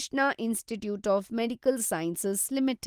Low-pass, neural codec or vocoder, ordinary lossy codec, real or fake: 14.4 kHz; none; Opus, 24 kbps; real